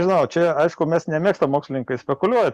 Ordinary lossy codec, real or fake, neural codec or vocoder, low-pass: Opus, 64 kbps; real; none; 14.4 kHz